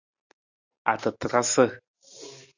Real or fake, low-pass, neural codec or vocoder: real; 7.2 kHz; none